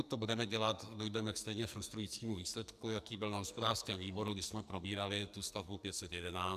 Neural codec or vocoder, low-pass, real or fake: codec, 44.1 kHz, 2.6 kbps, SNAC; 14.4 kHz; fake